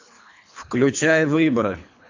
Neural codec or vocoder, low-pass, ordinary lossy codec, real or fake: codec, 24 kHz, 3 kbps, HILCodec; 7.2 kHz; AAC, 48 kbps; fake